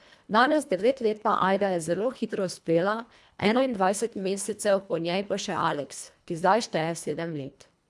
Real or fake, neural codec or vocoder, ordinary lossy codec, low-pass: fake; codec, 24 kHz, 1.5 kbps, HILCodec; none; none